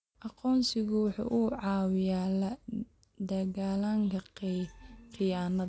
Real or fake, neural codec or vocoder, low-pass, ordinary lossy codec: real; none; none; none